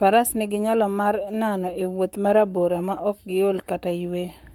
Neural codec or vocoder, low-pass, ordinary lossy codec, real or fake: codec, 44.1 kHz, 7.8 kbps, Pupu-Codec; 19.8 kHz; MP3, 96 kbps; fake